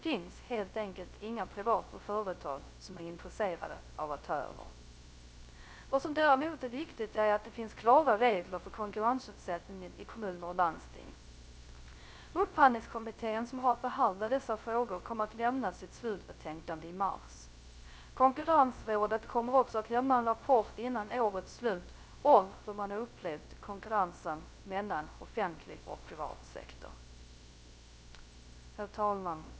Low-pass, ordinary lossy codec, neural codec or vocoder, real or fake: none; none; codec, 16 kHz, 0.3 kbps, FocalCodec; fake